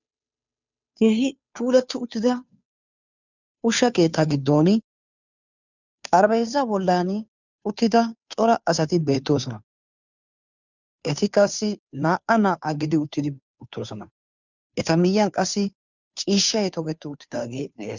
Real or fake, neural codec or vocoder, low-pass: fake; codec, 16 kHz, 2 kbps, FunCodec, trained on Chinese and English, 25 frames a second; 7.2 kHz